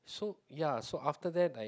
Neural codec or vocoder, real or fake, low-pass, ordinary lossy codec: none; real; none; none